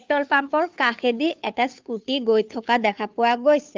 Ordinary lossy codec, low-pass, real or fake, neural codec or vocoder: Opus, 24 kbps; 7.2 kHz; fake; codec, 16 kHz, 2 kbps, FunCodec, trained on Chinese and English, 25 frames a second